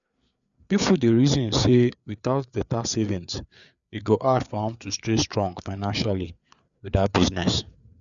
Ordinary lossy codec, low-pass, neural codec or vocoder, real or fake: none; 7.2 kHz; codec, 16 kHz, 4 kbps, FreqCodec, larger model; fake